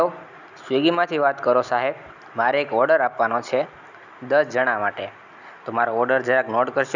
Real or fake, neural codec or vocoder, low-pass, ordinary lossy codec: real; none; 7.2 kHz; none